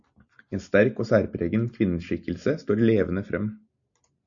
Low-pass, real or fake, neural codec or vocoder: 7.2 kHz; real; none